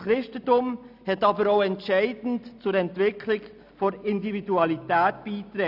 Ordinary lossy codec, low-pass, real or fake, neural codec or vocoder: none; 5.4 kHz; real; none